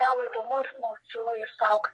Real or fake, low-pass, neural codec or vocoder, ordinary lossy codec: fake; 10.8 kHz; codec, 44.1 kHz, 3.4 kbps, Pupu-Codec; MP3, 48 kbps